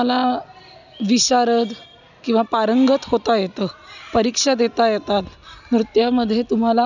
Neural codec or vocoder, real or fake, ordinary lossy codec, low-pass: none; real; none; 7.2 kHz